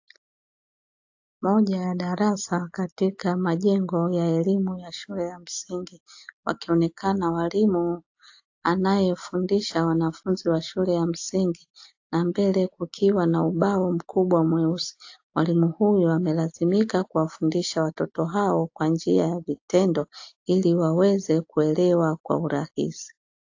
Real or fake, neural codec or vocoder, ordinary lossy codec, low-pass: real; none; AAC, 48 kbps; 7.2 kHz